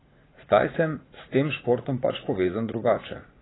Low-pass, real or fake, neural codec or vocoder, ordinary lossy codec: 7.2 kHz; fake; vocoder, 44.1 kHz, 80 mel bands, Vocos; AAC, 16 kbps